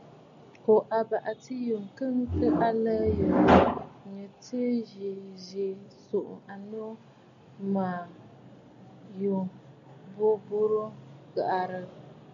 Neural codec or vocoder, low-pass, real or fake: none; 7.2 kHz; real